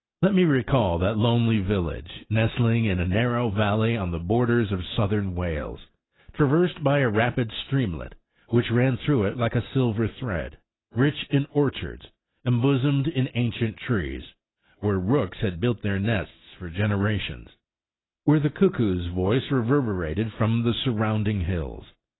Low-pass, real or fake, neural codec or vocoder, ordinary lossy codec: 7.2 kHz; real; none; AAC, 16 kbps